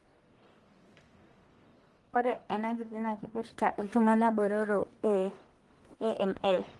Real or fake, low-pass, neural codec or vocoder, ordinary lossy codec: fake; 10.8 kHz; codec, 44.1 kHz, 1.7 kbps, Pupu-Codec; Opus, 24 kbps